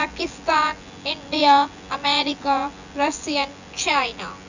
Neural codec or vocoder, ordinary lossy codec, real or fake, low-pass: vocoder, 24 kHz, 100 mel bands, Vocos; none; fake; 7.2 kHz